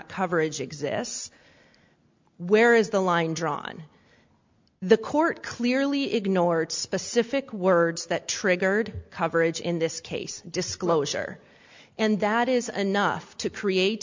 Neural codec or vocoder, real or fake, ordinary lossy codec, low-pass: none; real; MP3, 64 kbps; 7.2 kHz